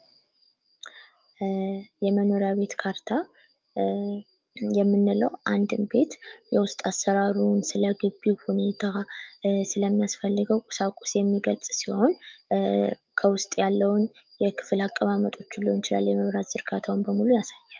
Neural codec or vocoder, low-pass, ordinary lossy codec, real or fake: autoencoder, 48 kHz, 128 numbers a frame, DAC-VAE, trained on Japanese speech; 7.2 kHz; Opus, 32 kbps; fake